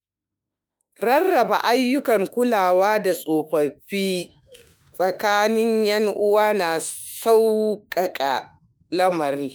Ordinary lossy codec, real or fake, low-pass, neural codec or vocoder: none; fake; none; autoencoder, 48 kHz, 32 numbers a frame, DAC-VAE, trained on Japanese speech